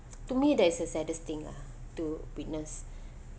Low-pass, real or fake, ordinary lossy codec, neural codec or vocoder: none; real; none; none